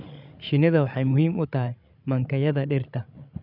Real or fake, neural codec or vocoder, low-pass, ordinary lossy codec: fake; codec, 16 kHz, 16 kbps, FreqCodec, larger model; 5.4 kHz; none